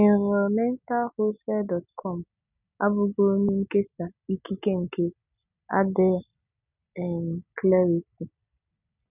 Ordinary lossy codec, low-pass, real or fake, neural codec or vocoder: none; 3.6 kHz; real; none